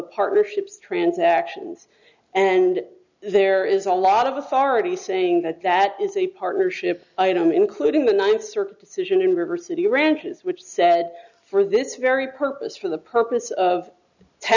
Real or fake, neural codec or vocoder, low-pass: real; none; 7.2 kHz